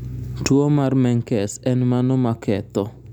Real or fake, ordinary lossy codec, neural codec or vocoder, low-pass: real; none; none; 19.8 kHz